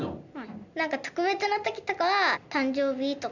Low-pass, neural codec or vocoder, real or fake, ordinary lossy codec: 7.2 kHz; none; real; none